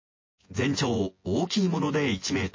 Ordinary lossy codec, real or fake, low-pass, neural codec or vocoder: MP3, 32 kbps; fake; 7.2 kHz; vocoder, 24 kHz, 100 mel bands, Vocos